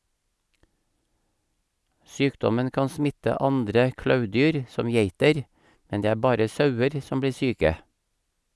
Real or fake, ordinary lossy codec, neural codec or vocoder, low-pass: real; none; none; none